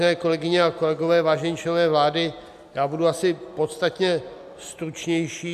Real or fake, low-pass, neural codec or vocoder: real; 14.4 kHz; none